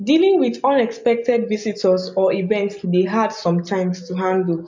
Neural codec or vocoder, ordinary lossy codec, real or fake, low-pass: none; MP3, 64 kbps; real; 7.2 kHz